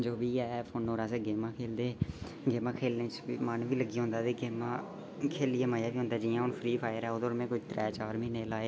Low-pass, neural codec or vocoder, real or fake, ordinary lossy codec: none; none; real; none